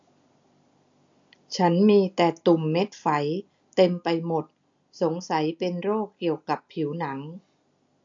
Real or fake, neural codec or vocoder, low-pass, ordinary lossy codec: real; none; 7.2 kHz; AAC, 64 kbps